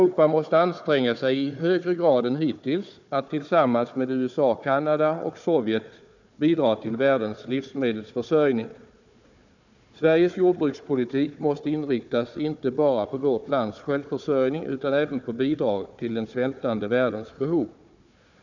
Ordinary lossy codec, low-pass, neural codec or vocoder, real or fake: none; 7.2 kHz; codec, 16 kHz, 4 kbps, FunCodec, trained on Chinese and English, 50 frames a second; fake